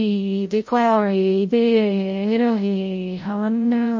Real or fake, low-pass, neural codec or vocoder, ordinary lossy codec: fake; 7.2 kHz; codec, 16 kHz, 0.5 kbps, FreqCodec, larger model; MP3, 32 kbps